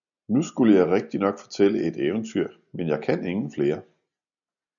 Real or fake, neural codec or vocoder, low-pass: real; none; 7.2 kHz